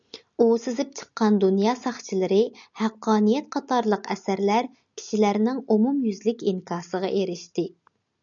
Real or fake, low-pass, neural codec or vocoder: real; 7.2 kHz; none